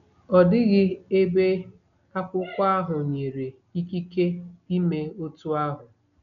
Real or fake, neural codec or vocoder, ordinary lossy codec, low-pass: real; none; none; 7.2 kHz